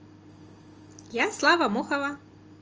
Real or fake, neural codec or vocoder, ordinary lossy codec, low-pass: real; none; Opus, 24 kbps; 7.2 kHz